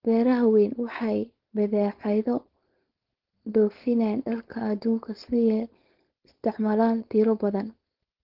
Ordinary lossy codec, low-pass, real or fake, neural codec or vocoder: Opus, 16 kbps; 5.4 kHz; fake; codec, 16 kHz, 4.8 kbps, FACodec